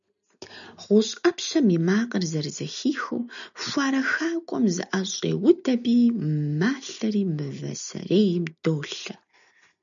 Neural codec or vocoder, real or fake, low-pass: none; real; 7.2 kHz